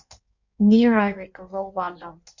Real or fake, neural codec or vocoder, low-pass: fake; codec, 16 kHz in and 24 kHz out, 1.1 kbps, FireRedTTS-2 codec; 7.2 kHz